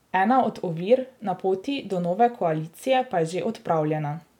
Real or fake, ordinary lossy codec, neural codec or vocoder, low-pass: real; none; none; 19.8 kHz